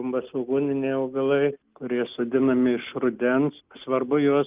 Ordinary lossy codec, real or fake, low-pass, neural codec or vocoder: Opus, 24 kbps; real; 3.6 kHz; none